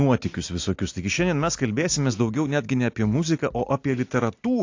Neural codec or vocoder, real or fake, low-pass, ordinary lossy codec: none; real; 7.2 kHz; AAC, 48 kbps